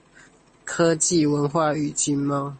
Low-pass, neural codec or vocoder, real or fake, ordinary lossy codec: 9.9 kHz; none; real; MP3, 32 kbps